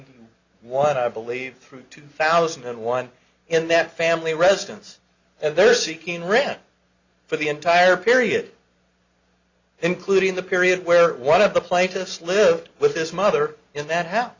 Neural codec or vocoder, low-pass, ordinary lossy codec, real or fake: none; 7.2 kHz; Opus, 64 kbps; real